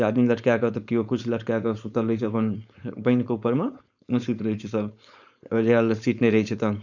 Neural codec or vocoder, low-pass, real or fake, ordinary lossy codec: codec, 16 kHz, 4.8 kbps, FACodec; 7.2 kHz; fake; none